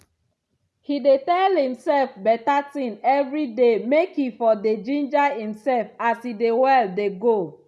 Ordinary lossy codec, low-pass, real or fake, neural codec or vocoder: none; none; real; none